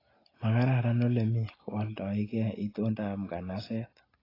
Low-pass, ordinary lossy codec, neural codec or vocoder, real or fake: 5.4 kHz; AAC, 24 kbps; none; real